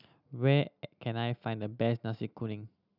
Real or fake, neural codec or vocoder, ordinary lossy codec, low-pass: real; none; none; 5.4 kHz